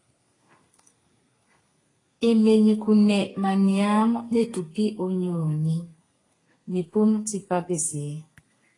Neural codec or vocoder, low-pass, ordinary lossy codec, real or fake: codec, 32 kHz, 1.9 kbps, SNAC; 10.8 kHz; AAC, 32 kbps; fake